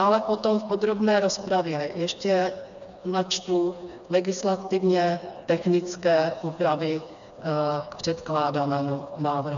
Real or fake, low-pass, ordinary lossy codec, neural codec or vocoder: fake; 7.2 kHz; MP3, 96 kbps; codec, 16 kHz, 2 kbps, FreqCodec, smaller model